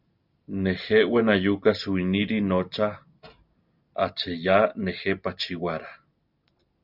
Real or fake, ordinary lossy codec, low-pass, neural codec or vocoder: real; Opus, 64 kbps; 5.4 kHz; none